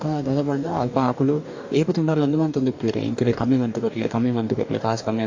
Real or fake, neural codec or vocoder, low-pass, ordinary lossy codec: fake; codec, 44.1 kHz, 2.6 kbps, DAC; 7.2 kHz; AAC, 48 kbps